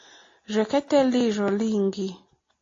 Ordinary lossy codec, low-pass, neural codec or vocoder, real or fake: AAC, 32 kbps; 7.2 kHz; none; real